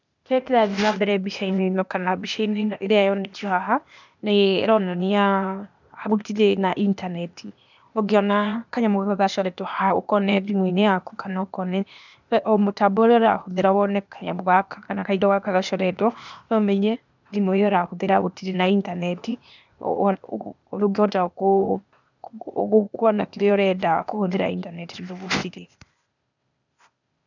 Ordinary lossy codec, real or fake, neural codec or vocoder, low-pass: none; fake; codec, 16 kHz, 0.8 kbps, ZipCodec; 7.2 kHz